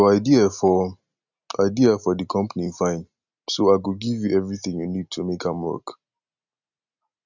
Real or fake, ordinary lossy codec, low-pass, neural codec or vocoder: real; none; 7.2 kHz; none